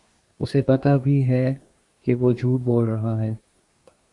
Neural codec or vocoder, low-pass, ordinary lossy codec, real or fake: codec, 24 kHz, 1 kbps, SNAC; 10.8 kHz; Opus, 64 kbps; fake